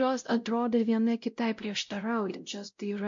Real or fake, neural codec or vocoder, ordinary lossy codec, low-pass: fake; codec, 16 kHz, 0.5 kbps, X-Codec, WavLM features, trained on Multilingual LibriSpeech; MP3, 48 kbps; 7.2 kHz